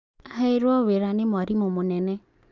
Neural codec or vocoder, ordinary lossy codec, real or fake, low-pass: none; Opus, 24 kbps; real; 7.2 kHz